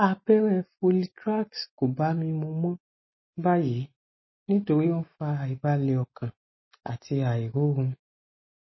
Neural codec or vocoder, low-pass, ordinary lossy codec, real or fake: none; 7.2 kHz; MP3, 24 kbps; real